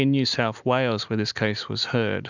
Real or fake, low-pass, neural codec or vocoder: real; 7.2 kHz; none